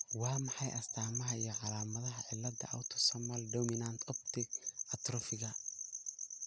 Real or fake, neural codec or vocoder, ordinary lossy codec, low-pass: real; none; none; none